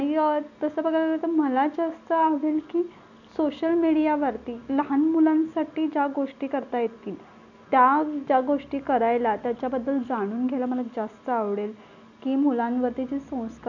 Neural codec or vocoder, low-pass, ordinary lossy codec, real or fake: none; 7.2 kHz; none; real